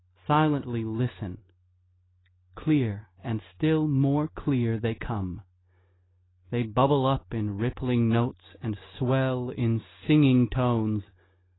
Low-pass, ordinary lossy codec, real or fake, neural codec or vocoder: 7.2 kHz; AAC, 16 kbps; real; none